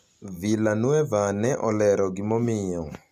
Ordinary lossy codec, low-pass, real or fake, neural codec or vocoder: AAC, 96 kbps; 14.4 kHz; real; none